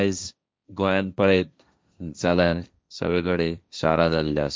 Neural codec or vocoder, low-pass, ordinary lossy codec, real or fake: codec, 16 kHz, 1.1 kbps, Voila-Tokenizer; none; none; fake